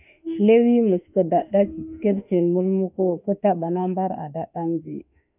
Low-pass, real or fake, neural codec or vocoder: 3.6 kHz; fake; autoencoder, 48 kHz, 32 numbers a frame, DAC-VAE, trained on Japanese speech